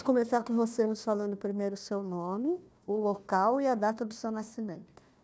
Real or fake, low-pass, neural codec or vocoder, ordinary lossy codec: fake; none; codec, 16 kHz, 1 kbps, FunCodec, trained on Chinese and English, 50 frames a second; none